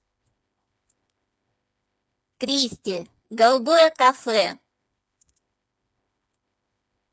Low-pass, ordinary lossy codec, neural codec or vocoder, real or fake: none; none; codec, 16 kHz, 4 kbps, FreqCodec, smaller model; fake